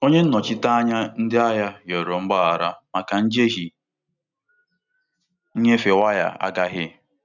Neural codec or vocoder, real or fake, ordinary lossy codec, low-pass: none; real; none; 7.2 kHz